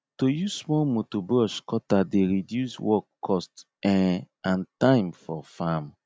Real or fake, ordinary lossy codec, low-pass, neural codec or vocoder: real; none; none; none